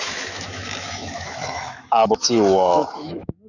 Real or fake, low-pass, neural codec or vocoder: fake; 7.2 kHz; autoencoder, 48 kHz, 128 numbers a frame, DAC-VAE, trained on Japanese speech